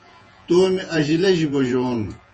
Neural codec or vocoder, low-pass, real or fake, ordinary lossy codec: vocoder, 48 kHz, 128 mel bands, Vocos; 10.8 kHz; fake; MP3, 32 kbps